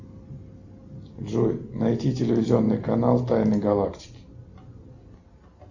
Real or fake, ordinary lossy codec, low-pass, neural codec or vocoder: real; Opus, 64 kbps; 7.2 kHz; none